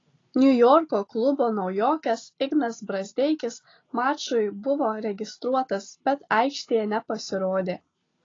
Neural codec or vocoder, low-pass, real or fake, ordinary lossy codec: none; 7.2 kHz; real; AAC, 32 kbps